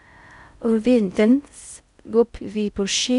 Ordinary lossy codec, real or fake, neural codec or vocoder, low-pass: none; fake; codec, 16 kHz in and 24 kHz out, 0.6 kbps, FocalCodec, streaming, 4096 codes; 10.8 kHz